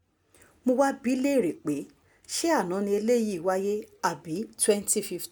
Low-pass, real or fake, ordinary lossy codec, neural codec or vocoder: none; real; none; none